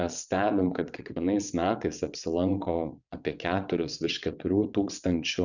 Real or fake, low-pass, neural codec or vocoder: fake; 7.2 kHz; vocoder, 22.05 kHz, 80 mel bands, WaveNeXt